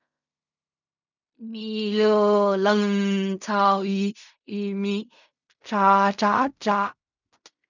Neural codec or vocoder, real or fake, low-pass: codec, 16 kHz in and 24 kHz out, 0.4 kbps, LongCat-Audio-Codec, fine tuned four codebook decoder; fake; 7.2 kHz